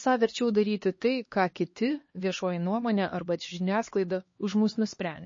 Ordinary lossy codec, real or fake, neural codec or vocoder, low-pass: MP3, 32 kbps; fake; codec, 16 kHz, 2 kbps, X-Codec, HuBERT features, trained on LibriSpeech; 7.2 kHz